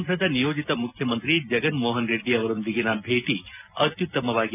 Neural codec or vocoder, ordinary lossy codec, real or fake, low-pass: none; none; real; 3.6 kHz